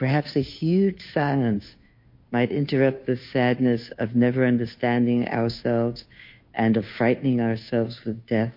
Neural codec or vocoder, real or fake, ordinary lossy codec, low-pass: codec, 16 kHz, 2 kbps, FunCodec, trained on Chinese and English, 25 frames a second; fake; MP3, 32 kbps; 5.4 kHz